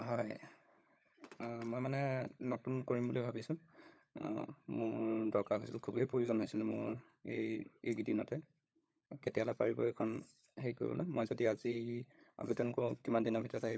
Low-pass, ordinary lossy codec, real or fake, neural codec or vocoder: none; none; fake; codec, 16 kHz, 16 kbps, FunCodec, trained on LibriTTS, 50 frames a second